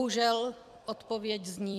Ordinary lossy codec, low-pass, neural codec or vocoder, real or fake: MP3, 96 kbps; 14.4 kHz; vocoder, 44.1 kHz, 128 mel bands every 256 samples, BigVGAN v2; fake